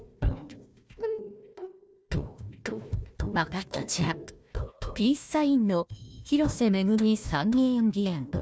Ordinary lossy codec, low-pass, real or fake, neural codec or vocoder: none; none; fake; codec, 16 kHz, 1 kbps, FunCodec, trained on Chinese and English, 50 frames a second